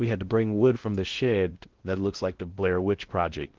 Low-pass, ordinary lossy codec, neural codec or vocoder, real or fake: 7.2 kHz; Opus, 16 kbps; codec, 16 kHz in and 24 kHz out, 0.6 kbps, FocalCodec, streaming, 2048 codes; fake